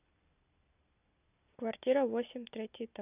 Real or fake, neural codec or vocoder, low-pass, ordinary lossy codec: real; none; 3.6 kHz; none